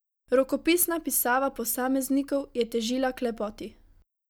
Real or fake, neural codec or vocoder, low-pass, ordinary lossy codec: real; none; none; none